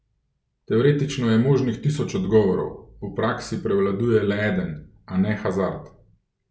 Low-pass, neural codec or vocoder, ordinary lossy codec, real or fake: none; none; none; real